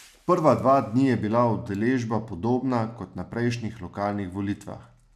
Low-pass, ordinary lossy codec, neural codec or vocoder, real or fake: 14.4 kHz; none; none; real